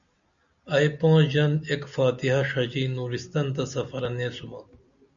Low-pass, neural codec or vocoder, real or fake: 7.2 kHz; none; real